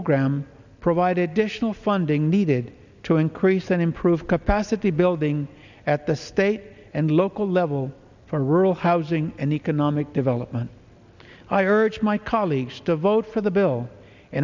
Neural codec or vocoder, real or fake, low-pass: none; real; 7.2 kHz